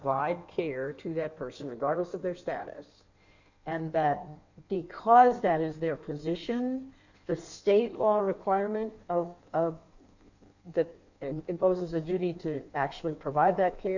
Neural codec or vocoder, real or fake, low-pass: codec, 16 kHz in and 24 kHz out, 1.1 kbps, FireRedTTS-2 codec; fake; 7.2 kHz